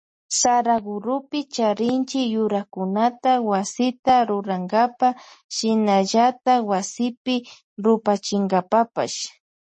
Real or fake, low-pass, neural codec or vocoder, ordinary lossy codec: real; 9.9 kHz; none; MP3, 32 kbps